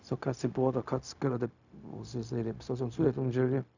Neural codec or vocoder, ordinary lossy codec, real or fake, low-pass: codec, 16 kHz, 0.4 kbps, LongCat-Audio-Codec; none; fake; 7.2 kHz